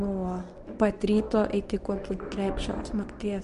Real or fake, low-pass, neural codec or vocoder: fake; 10.8 kHz; codec, 24 kHz, 0.9 kbps, WavTokenizer, medium speech release version 1